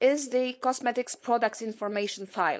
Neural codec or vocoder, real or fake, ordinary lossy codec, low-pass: codec, 16 kHz, 4.8 kbps, FACodec; fake; none; none